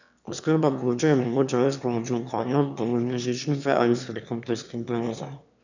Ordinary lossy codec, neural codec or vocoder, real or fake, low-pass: none; autoencoder, 22.05 kHz, a latent of 192 numbers a frame, VITS, trained on one speaker; fake; 7.2 kHz